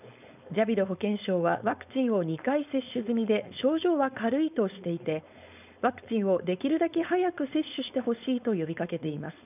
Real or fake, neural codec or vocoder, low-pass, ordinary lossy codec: fake; codec, 16 kHz, 4.8 kbps, FACodec; 3.6 kHz; none